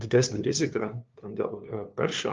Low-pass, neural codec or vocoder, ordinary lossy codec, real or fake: 7.2 kHz; codec, 16 kHz, 2 kbps, FunCodec, trained on LibriTTS, 25 frames a second; Opus, 32 kbps; fake